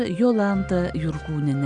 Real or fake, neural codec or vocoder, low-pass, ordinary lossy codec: real; none; 9.9 kHz; Opus, 64 kbps